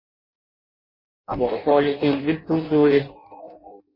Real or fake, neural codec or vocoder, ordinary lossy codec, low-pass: fake; codec, 16 kHz in and 24 kHz out, 0.6 kbps, FireRedTTS-2 codec; MP3, 24 kbps; 5.4 kHz